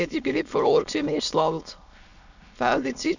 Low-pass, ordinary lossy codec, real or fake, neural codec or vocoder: 7.2 kHz; none; fake; autoencoder, 22.05 kHz, a latent of 192 numbers a frame, VITS, trained on many speakers